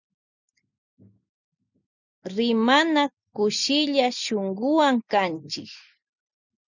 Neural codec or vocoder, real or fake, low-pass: none; real; 7.2 kHz